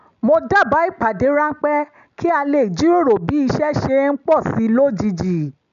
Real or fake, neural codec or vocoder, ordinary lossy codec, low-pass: real; none; none; 7.2 kHz